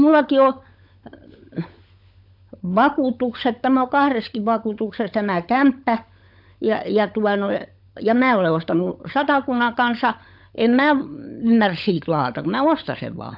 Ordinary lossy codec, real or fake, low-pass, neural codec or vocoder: AAC, 48 kbps; fake; 5.4 kHz; codec, 16 kHz, 16 kbps, FunCodec, trained on LibriTTS, 50 frames a second